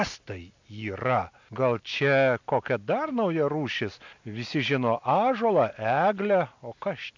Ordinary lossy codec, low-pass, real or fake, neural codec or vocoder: MP3, 64 kbps; 7.2 kHz; real; none